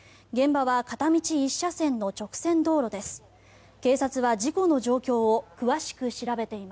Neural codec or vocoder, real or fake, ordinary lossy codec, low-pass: none; real; none; none